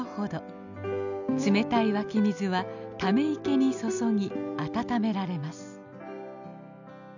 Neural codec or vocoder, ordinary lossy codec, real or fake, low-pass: none; none; real; 7.2 kHz